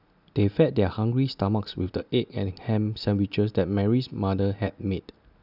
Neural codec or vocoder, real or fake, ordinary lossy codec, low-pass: none; real; none; 5.4 kHz